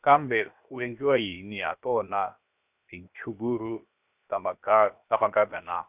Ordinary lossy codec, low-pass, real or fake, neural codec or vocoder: none; 3.6 kHz; fake; codec, 16 kHz, 0.7 kbps, FocalCodec